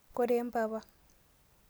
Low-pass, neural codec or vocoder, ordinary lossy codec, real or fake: none; none; none; real